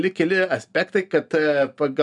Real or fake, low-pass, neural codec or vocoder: real; 10.8 kHz; none